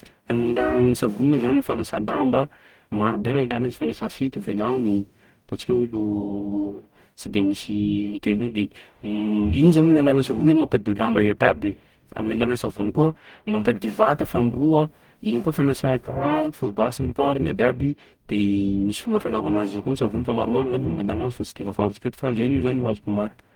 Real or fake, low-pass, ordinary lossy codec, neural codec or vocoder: fake; 19.8 kHz; Opus, 32 kbps; codec, 44.1 kHz, 0.9 kbps, DAC